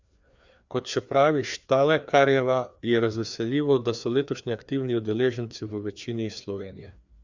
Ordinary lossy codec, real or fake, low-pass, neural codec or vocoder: Opus, 64 kbps; fake; 7.2 kHz; codec, 16 kHz, 2 kbps, FreqCodec, larger model